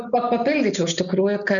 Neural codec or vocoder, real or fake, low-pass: none; real; 7.2 kHz